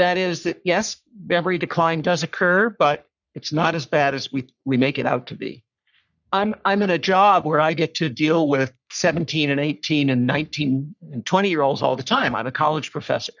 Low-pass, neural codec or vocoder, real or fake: 7.2 kHz; codec, 44.1 kHz, 3.4 kbps, Pupu-Codec; fake